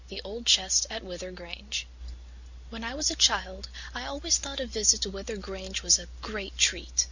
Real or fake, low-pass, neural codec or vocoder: real; 7.2 kHz; none